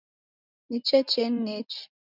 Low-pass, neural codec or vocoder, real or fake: 5.4 kHz; none; real